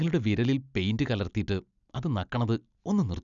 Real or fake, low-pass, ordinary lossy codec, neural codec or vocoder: real; 7.2 kHz; none; none